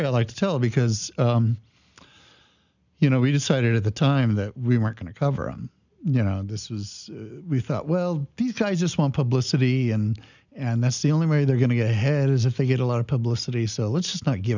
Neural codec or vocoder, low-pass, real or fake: none; 7.2 kHz; real